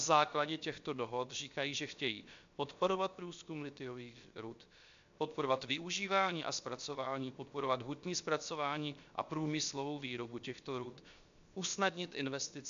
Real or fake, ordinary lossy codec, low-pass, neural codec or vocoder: fake; MP3, 64 kbps; 7.2 kHz; codec, 16 kHz, 0.7 kbps, FocalCodec